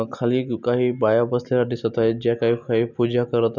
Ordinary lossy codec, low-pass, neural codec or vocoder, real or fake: none; 7.2 kHz; none; real